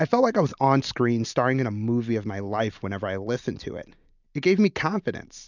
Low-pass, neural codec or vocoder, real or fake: 7.2 kHz; none; real